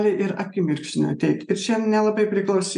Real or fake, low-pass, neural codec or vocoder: real; 10.8 kHz; none